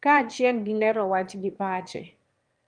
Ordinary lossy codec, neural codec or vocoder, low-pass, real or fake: Opus, 32 kbps; autoencoder, 22.05 kHz, a latent of 192 numbers a frame, VITS, trained on one speaker; 9.9 kHz; fake